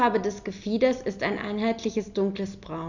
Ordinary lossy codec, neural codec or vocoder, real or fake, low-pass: none; none; real; 7.2 kHz